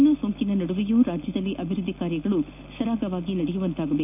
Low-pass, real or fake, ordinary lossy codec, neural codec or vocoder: 3.6 kHz; real; none; none